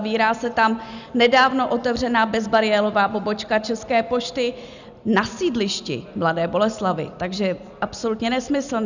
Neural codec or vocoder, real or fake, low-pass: none; real; 7.2 kHz